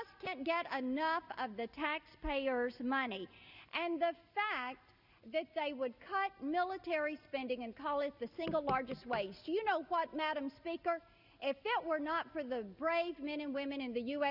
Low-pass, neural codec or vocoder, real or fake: 5.4 kHz; none; real